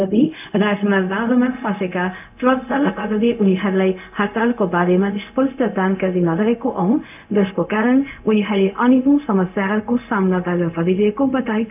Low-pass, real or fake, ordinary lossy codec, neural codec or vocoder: 3.6 kHz; fake; none; codec, 16 kHz, 0.4 kbps, LongCat-Audio-Codec